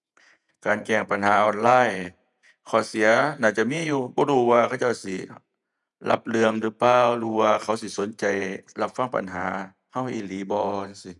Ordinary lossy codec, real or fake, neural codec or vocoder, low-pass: none; fake; vocoder, 48 kHz, 128 mel bands, Vocos; 10.8 kHz